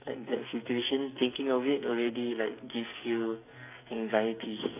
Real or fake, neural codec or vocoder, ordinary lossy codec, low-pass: fake; codec, 44.1 kHz, 2.6 kbps, SNAC; AAC, 32 kbps; 3.6 kHz